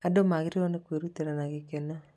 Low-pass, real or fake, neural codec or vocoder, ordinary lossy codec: none; real; none; none